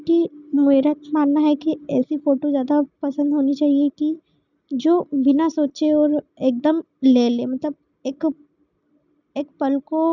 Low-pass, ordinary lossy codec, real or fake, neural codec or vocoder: 7.2 kHz; none; real; none